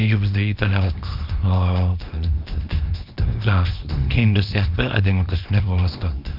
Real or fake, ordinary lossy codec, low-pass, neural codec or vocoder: fake; none; 5.4 kHz; codec, 24 kHz, 0.9 kbps, WavTokenizer, small release